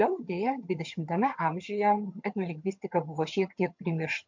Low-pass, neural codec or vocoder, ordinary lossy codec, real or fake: 7.2 kHz; vocoder, 22.05 kHz, 80 mel bands, HiFi-GAN; MP3, 48 kbps; fake